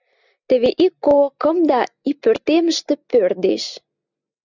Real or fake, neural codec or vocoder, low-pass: real; none; 7.2 kHz